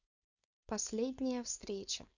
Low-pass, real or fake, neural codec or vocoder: 7.2 kHz; fake; codec, 16 kHz, 4.8 kbps, FACodec